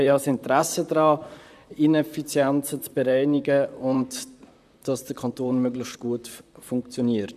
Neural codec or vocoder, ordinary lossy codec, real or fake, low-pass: vocoder, 44.1 kHz, 128 mel bands, Pupu-Vocoder; none; fake; 14.4 kHz